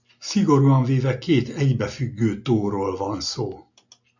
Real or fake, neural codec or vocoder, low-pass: real; none; 7.2 kHz